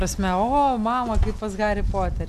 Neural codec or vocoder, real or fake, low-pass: none; real; 14.4 kHz